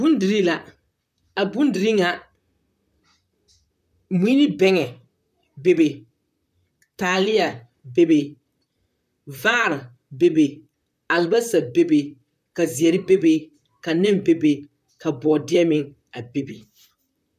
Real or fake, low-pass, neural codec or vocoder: fake; 14.4 kHz; vocoder, 44.1 kHz, 128 mel bands, Pupu-Vocoder